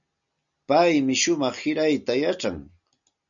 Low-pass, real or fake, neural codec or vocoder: 7.2 kHz; real; none